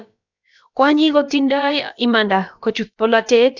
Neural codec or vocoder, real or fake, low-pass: codec, 16 kHz, about 1 kbps, DyCAST, with the encoder's durations; fake; 7.2 kHz